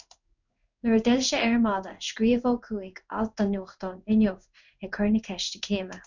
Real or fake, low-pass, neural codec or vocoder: fake; 7.2 kHz; codec, 16 kHz in and 24 kHz out, 1 kbps, XY-Tokenizer